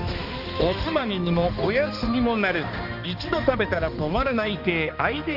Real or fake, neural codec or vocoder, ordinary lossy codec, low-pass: fake; codec, 16 kHz, 2 kbps, X-Codec, HuBERT features, trained on balanced general audio; Opus, 32 kbps; 5.4 kHz